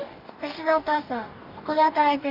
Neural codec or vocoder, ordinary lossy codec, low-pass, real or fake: codec, 44.1 kHz, 2.6 kbps, DAC; none; 5.4 kHz; fake